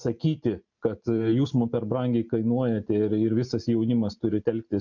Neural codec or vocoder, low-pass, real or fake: none; 7.2 kHz; real